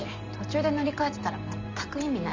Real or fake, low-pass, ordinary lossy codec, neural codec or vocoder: real; 7.2 kHz; MP3, 64 kbps; none